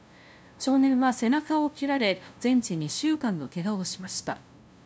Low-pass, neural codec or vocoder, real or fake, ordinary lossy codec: none; codec, 16 kHz, 0.5 kbps, FunCodec, trained on LibriTTS, 25 frames a second; fake; none